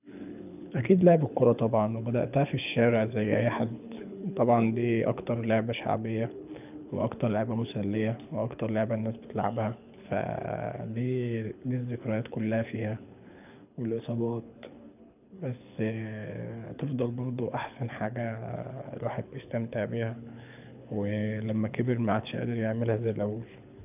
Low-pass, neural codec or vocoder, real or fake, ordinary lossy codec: 3.6 kHz; codec, 24 kHz, 6 kbps, HILCodec; fake; none